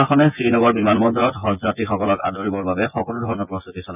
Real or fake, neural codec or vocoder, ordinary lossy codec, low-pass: fake; vocoder, 24 kHz, 100 mel bands, Vocos; none; 3.6 kHz